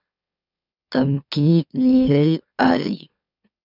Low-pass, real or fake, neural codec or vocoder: 5.4 kHz; fake; autoencoder, 44.1 kHz, a latent of 192 numbers a frame, MeloTTS